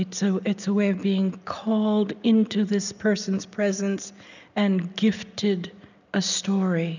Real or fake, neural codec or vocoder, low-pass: real; none; 7.2 kHz